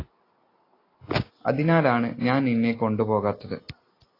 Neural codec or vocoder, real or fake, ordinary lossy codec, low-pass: none; real; AAC, 24 kbps; 5.4 kHz